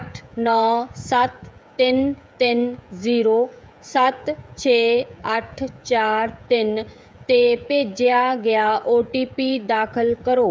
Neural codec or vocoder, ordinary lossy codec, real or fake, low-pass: codec, 16 kHz, 16 kbps, FreqCodec, smaller model; none; fake; none